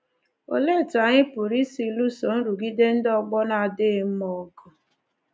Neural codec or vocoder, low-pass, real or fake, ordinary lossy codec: none; none; real; none